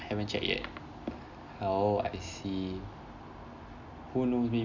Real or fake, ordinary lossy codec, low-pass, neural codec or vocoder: real; none; 7.2 kHz; none